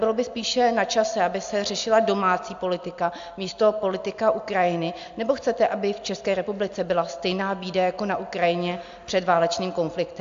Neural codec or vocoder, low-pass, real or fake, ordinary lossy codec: none; 7.2 kHz; real; AAC, 64 kbps